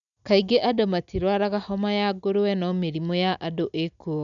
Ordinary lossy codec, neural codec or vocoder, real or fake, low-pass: none; none; real; 7.2 kHz